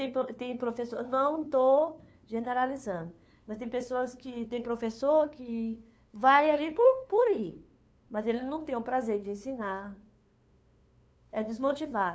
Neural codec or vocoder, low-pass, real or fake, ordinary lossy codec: codec, 16 kHz, 2 kbps, FunCodec, trained on LibriTTS, 25 frames a second; none; fake; none